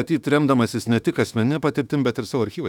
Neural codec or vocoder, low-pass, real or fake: autoencoder, 48 kHz, 32 numbers a frame, DAC-VAE, trained on Japanese speech; 19.8 kHz; fake